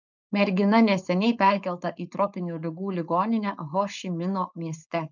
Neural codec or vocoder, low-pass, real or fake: codec, 16 kHz, 4.8 kbps, FACodec; 7.2 kHz; fake